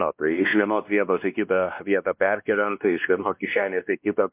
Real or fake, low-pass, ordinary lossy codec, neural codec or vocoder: fake; 3.6 kHz; MP3, 32 kbps; codec, 16 kHz, 1 kbps, X-Codec, WavLM features, trained on Multilingual LibriSpeech